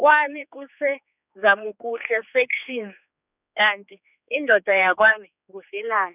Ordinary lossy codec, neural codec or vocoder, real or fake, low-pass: none; codec, 16 kHz, 4 kbps, X-Codec, HuBERT features, trained on general audio; fake; 3.6 kHz